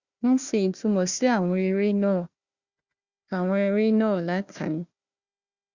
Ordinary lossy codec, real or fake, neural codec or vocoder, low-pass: Opus, 64 kbps; fake; codec, 16 kHz, 1 kbps, FunCodec, trained on Chinese and English, 50 frames a second; 7.2 kHz